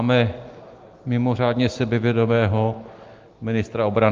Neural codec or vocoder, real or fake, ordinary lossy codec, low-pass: none; real; Opus, 32 kbps; 7.2 kHz